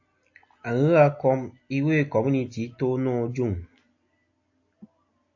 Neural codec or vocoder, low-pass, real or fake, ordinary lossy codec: none; 7.2 kHz; real; AAC, 48 kbps